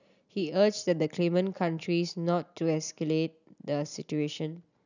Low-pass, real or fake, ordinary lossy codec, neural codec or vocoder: 7.2 kHz; real; none; none